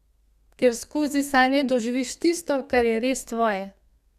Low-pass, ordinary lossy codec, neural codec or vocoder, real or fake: 14.4 kHz; none; codec, 32 kHz, 1.9 kbps, SNAC; fake